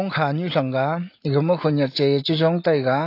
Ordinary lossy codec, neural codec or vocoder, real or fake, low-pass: AAC, 32 kbps; none; real; 5.4 kHz